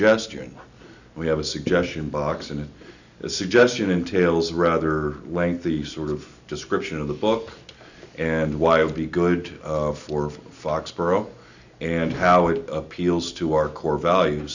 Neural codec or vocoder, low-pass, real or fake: none; 7.2 kHz; real